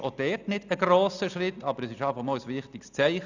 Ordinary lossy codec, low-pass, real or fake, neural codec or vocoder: none; 7.2 kHz; real; none